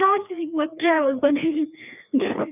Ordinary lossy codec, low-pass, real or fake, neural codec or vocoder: none; 3.6 kHz; fake; codec, 16 kHz, 2 kbps, FreqCodec, larger model